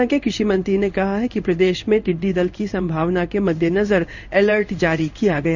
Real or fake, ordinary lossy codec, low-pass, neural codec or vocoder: fake; none; 7.2 kHz; codec, 16 kHz in and 24 kHz out, 1 kbps, XY-Tokenizer